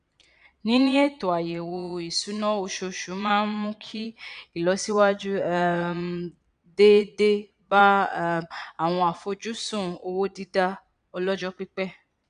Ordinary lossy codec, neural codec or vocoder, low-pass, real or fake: none; vocoder, 22.05 kHz, 80 mel bands, Vocos; 9.9 kHz; fake